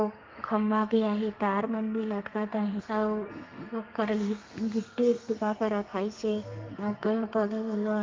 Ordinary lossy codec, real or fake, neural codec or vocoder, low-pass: Opus, 32 kbps; fake; codec, 32 kHz, 1.9 kbps, SNAC; 7.2 kHz